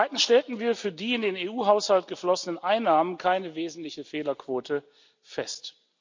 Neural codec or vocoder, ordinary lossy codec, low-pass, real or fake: none; MP3, 64 kbps; 7.2 kHz; real